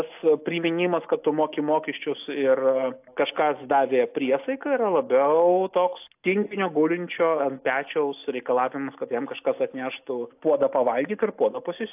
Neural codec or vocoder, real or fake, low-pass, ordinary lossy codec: none; real; 3.6 kHz; AAC, 32 kbps